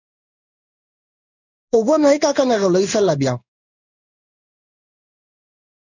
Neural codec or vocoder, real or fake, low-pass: codec, 16 kHz in and 24 kHz out, 1 kbps, XY-Tokenizer; fake; 7.2 kHz